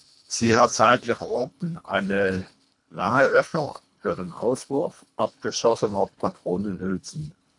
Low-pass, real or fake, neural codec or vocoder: 10.8 kHz; fake; codec, 24 kHz, 1.5 kbps, HILCodec